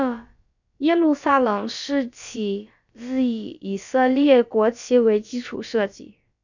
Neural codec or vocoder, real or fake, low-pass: codec, 16 kHz, about 1 kbps, DyCAST, with the encoder's durations; fake; 7.2 kHz